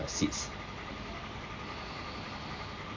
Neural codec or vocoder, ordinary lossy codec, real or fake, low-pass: none; MP3, 48 kbps; real; 7.2 kHz